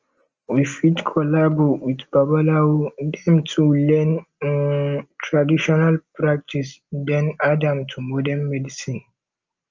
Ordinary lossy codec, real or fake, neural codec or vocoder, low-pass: Opus, 24 kbps; real; none; 7.2 kHz